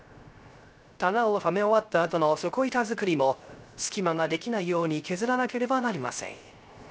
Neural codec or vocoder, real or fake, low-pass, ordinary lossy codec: codec, 16 kHz, 0.3 kbps, FocalCodec; fake; none; none